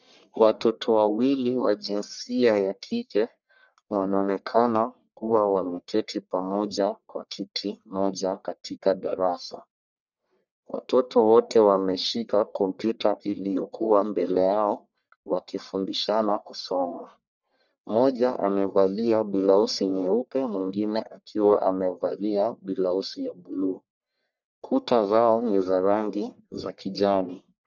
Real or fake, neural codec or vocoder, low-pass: fake; codec, 44.1 kHz, 1.7 kbps, Pupu-Codec; 7.2 kHz